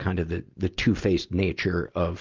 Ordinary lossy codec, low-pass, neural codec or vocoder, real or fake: Opus, 24 kbps; 7.2 kHz; none; real